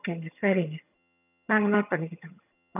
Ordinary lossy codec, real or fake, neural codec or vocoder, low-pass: none; fake; vocoder, 22.05 kHz, 80 mel bands, HiFi-GAN; 3.6 kHz